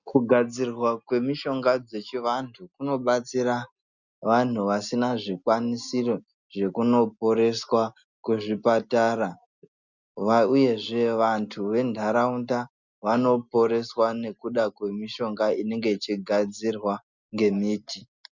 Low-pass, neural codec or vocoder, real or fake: 7.2 kHz; none; real